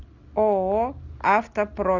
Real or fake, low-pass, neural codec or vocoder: real; 7.2 kHz; none